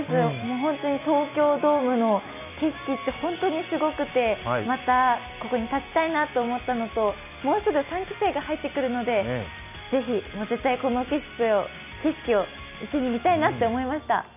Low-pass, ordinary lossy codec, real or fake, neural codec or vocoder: 3.6 kHz; none; real; none